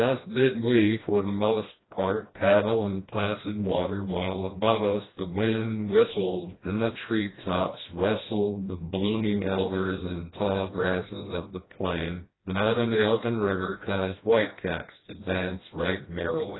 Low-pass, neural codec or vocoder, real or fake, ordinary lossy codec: 7.2 kHz; codec, 16 kHz, 1 kbps, FreqCodec, smaller model; fake; AAC, 16 kbps